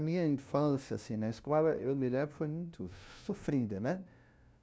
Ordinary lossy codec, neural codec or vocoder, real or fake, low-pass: none; codec, 16 kHz, 0.5 kbps, FunCodec, trained on LibriTTS, 25 frames a second; fake; none